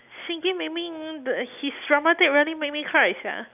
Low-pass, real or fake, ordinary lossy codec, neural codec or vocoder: 3.6 kHz; real; none; none